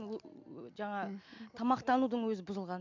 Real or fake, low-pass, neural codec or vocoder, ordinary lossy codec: real; 7.2 kHz; none; MP3, 64 kbps